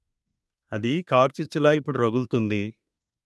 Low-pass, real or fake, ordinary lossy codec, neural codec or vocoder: none; fake; none; codec, 24 kHz, 1 kbps, SNAC